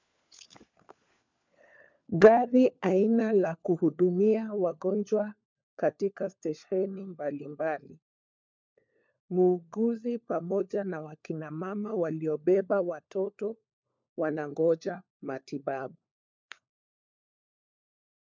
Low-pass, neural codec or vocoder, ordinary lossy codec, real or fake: 7.2 kHz; codec, 16 kHz, 4 kbps, FunCodec, trained on LibriTTS, 50 frames a second; MP3, 64 kbps; fake